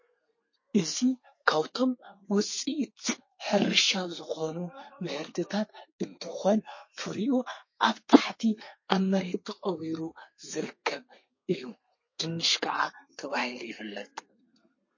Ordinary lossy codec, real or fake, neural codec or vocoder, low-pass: MP3, 32 kbps; fake; codec, 32 kHz, 1.9 kbps, SNAC; 7.2 kHz